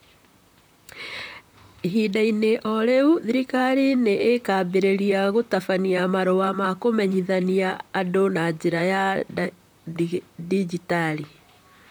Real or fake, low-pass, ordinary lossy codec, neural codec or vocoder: fake; none; none; vocoder, 44.1 kHz, 128 mel bands, Pupu-Vocoder